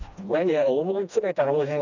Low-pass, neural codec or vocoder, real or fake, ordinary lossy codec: 7.2 kHz; codec, 16 kHz, 1 kbps, FreqCodec, smaller model; fake; none